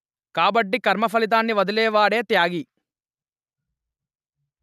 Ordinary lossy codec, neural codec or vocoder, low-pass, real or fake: none; none; 14.4 kHz; real